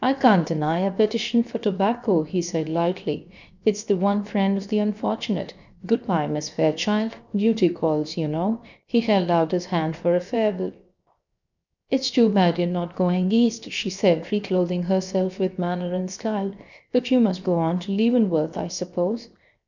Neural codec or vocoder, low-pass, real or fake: codec, 16 kHz, 0.7 kbps, FocalCodec; 7.2 kHz; fake